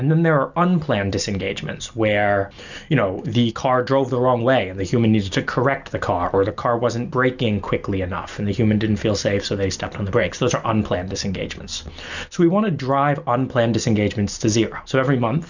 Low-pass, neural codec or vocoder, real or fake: 7.2 kHz; none; real